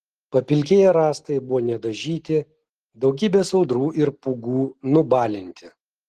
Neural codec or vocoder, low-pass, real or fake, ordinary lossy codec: none; 14.4 kHz; real; Opus, 16 kbps